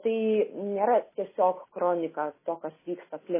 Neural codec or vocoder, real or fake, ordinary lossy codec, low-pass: none; real; MP3, 16 kbps; 3.6 kHz